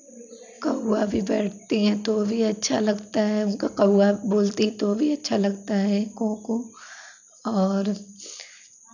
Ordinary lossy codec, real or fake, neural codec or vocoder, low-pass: none; real; none; 7.2 kHz